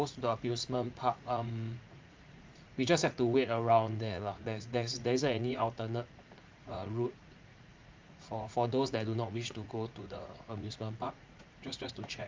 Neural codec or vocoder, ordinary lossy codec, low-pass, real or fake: vocoder, 44.1 kHz, 80 mel bands, Vocos; Opus, 24 kbps; 7.2 kHz; fake